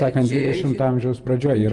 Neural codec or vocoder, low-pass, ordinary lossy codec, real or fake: vocoder, 24 kHz, 100 mel bands, Vocos; 10.8 kHz; Opus, 32 kbps; fake